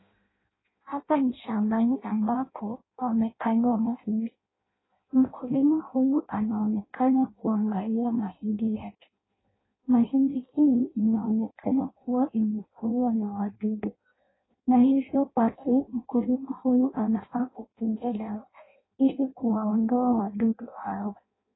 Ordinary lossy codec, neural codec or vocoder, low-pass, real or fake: AAC, 16 kbps; codec, 16 kHz in and 24 kHz out, 0.6 kbps, FireRedTTS-2 codec; 7.2 kHz; fake